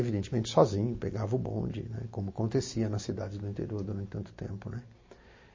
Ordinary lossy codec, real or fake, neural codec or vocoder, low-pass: MP3, 32 kbps; real; none; 7.2 kHz